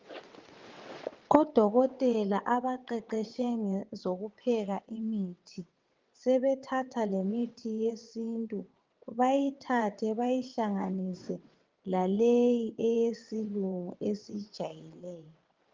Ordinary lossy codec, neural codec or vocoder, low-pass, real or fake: Opus, 24 kbps; vocoder, 22.05 kHz, 80 mel bands, WaveNeXt; 7.2 kHz; fake